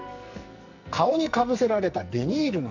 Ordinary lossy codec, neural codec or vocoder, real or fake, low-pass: none; codec, 44.1 kHz, 2.6 kbps, SNAC; fake; 7.2 kHz